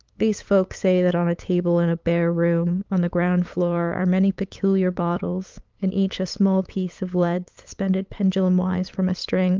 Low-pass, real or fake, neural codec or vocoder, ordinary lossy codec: 7.2 kHz; fake; codec, 16 kHz, 8 kbps, FunCodec, trained on LibriTTS, 25 frames a second; Opus, 24 kbps